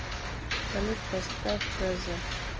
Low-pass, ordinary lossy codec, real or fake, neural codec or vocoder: 7.2 kHz; Opus, 24 kbps; real; none